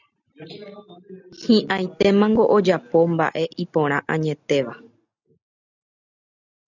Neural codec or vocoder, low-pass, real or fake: none; 7.2 kHz; real